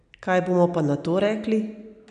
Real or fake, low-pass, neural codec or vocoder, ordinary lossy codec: real; 9.9 kHz; none; none